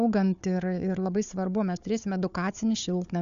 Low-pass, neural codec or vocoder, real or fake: 7.2 kHz; codec, 16 kHz, 4 kbps, FunCodec, trained on Chinese and English, 50 frames a second; fake